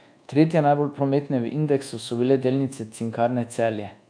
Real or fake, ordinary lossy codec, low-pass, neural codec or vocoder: fake; AAC, 64 kbps; 9.9 kHz; codec, 24 kHz, 1.2 kbps, DualCodec